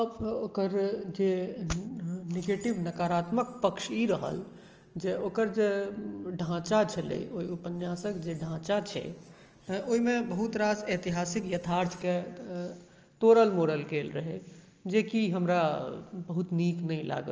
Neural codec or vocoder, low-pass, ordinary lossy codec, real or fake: none; 7.2 kHz; Opus, 24 kbps; real